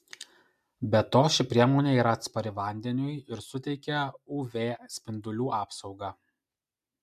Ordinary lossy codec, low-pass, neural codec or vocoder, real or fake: MP3, 96 kbps; 14.4 kHz; none; real